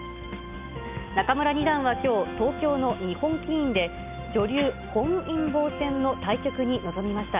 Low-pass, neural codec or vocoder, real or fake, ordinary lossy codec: 3.6 kHz; none; real; none